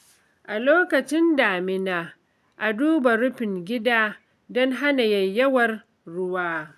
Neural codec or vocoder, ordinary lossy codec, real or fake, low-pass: none; none; real; 14.4 kHz